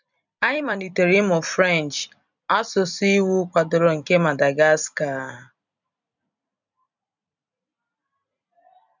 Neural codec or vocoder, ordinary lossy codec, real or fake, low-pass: vocoder, 44.1 kHz, 128 mel bands every 256 samples, BigVGAN v2; none; fake; 7.2 kHz